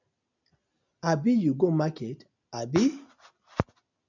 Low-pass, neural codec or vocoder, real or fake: 7.2 kHz; none; real